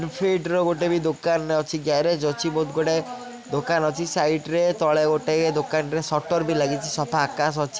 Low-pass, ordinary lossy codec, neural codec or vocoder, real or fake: none; none; none; real